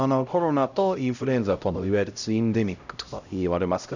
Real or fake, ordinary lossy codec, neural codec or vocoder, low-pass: fake; none; codec, 16 kHz, 0.5 kbps, X-Codec, HuBERT features, trained on LibriSpeech; 7.2 kHz